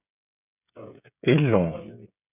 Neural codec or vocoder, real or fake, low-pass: codec, 16 kHz, 16 kbps, FreqCodec, smaller model; fake; 3.6 kHz